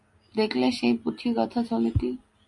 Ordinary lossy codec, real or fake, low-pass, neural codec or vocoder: MP3, 64 kbps; real; 10.8 kHz; none